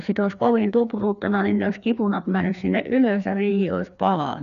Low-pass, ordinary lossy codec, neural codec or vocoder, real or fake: 7.2 kHz; none; codec, 16 kHz, 2 kbps, FreqCodec, larger model; fake